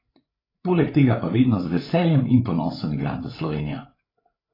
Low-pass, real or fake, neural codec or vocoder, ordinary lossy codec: 5.4 kHz; fake; codec, 16 kHz, 8 kbps, FreqCodec, larger model; AAC, 24 kbps